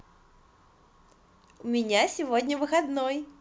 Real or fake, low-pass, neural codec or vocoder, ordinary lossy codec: real; none; none; none